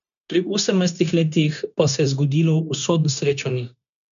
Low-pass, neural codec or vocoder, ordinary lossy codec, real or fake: 7.2 kHz; codec, 16 kHz, 0.9 kbps, LongCat-Audio-Codec; none; fake